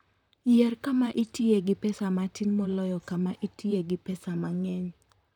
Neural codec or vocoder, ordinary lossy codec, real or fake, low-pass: vocoder, 44.1 kHz, 128 mel bands, Pupu-Vocoder; none; fake; 19.8 kHz